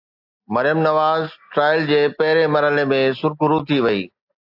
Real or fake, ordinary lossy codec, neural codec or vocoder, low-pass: real; AAC, 48 kbps; none; 5.4 kHz